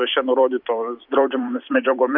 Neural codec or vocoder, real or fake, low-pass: vocoder, 44.1 kHz, 128 mel bands every 256 samples, BigVGAN v2; fake; 5.4 kHz